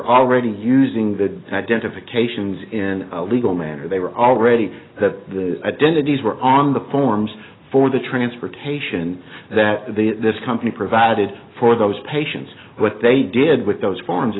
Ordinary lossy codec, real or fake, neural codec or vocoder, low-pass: AAC, 16 kbps; real; none; 7.2 kHz